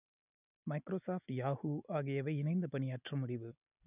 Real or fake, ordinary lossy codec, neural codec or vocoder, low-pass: real; none; none; 3.6 kHz